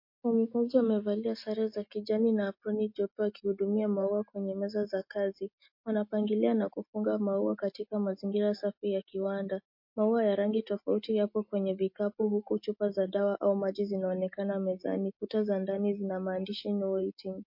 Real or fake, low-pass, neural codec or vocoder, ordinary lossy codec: real; 5.4 kHz; none; MP3, 32 kbps